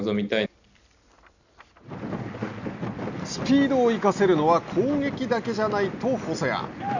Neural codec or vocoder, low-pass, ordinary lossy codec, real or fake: none; 7.2 kHz; none; real